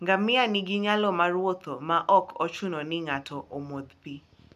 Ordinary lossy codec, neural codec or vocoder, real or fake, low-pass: none; none; real; 14.4 kHz